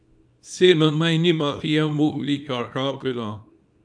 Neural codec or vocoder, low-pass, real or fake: codec, 24 kHz, 0.9 kbps, WavTokenizer, small release; 9.9 kHz; fake